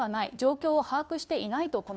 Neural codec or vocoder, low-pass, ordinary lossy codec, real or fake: codec, 16 kHz, 2 kbps, FunCodec, trained on Chinese and English, 25 frames a second; none; none; fake